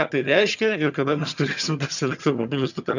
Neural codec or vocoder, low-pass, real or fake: vocoder, 22.05 kHz, 80 mel bands, HiFi-GAN; 7.2 kHz; fake